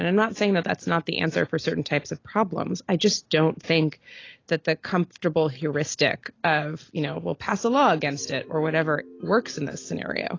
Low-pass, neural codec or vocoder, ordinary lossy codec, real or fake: 7.2 kHz; none; AAC, 32 kbps; real